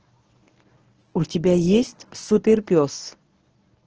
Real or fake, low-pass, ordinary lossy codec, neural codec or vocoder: fake; 7.2 kHz; Opus, 16 kbps; codec, 24 kHz, 0.9 kbps, WavTokenizer, medium speech release version 1